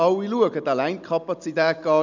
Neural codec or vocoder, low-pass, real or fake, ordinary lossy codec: none; 7.2 kHz; real; none